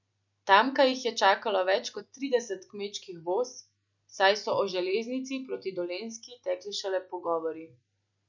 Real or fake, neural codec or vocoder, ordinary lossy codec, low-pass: real; none; none; 7.2 kHz